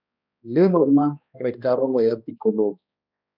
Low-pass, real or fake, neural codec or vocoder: 5.4 kHz; fake; codec, 16 kHz, 1 kbps, X-Codec, HuBERT features, trained on balanced general audio